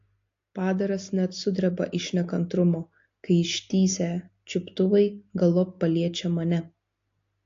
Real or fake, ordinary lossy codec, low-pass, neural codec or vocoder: real; AAC, 48 kbps; 7.2 kHz; none